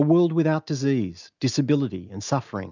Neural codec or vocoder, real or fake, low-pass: none; real; 7.2 kHz